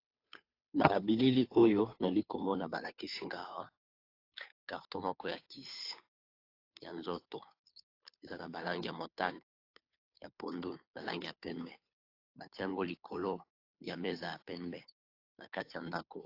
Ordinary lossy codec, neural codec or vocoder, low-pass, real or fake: AAC, 32 kbps; codec, 16 kHz, 2 kbps, FunCodec, trained on Chinese and English, 25 frames a second; 5.4 kHz; fake